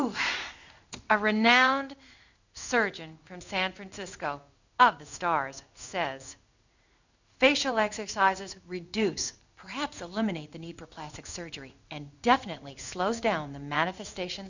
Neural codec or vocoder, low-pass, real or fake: codec, 16 kHz in and 24 kHz out, 1 kbps, XY-Tokenizer; 7.2 kHz; fake